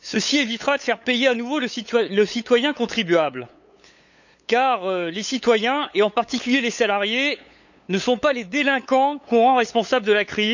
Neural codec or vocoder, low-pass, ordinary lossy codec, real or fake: codec, 16 kHz, 8 kbps, FunCodec, trained on LibriTTS, 25 frames a second; 7.2 kHz; none; fake